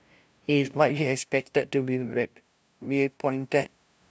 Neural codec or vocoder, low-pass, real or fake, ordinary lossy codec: codec, 16 kHz, 0.5 kbps, FunCodec, trained on LibriTTS, 25 frames a second; none; fake; none